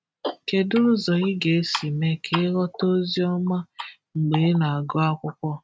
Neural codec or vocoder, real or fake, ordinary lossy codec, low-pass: none; real; none; none